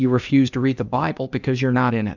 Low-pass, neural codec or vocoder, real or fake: 7.2 kHz; codec, 16 kHz, 0.8 kbps, ZipCodec; fake